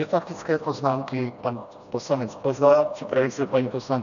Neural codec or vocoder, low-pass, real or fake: codec, 16 kHz, 1 kbps, FreqCodec, smaller model; 7.2 kHz; fake